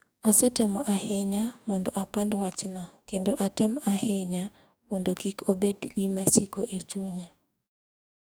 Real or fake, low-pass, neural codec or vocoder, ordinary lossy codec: fake; none; codec, 44.1 kHz, 2.6 kbps, DAC; none